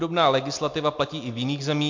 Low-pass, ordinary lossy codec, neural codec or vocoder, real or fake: 7.2 kHz; MP3, 48 kbps; none; real